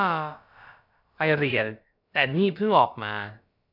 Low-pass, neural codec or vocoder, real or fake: 5.4 kHz; codec, 16 kHz, about 1 kbps, DyCAST, with the encoder's durations; fake